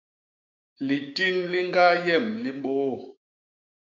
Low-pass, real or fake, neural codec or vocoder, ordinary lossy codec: 7.2 kHz; fake; codec, 16 kHz, 6 kbps, DAC; MP3, 48 kbps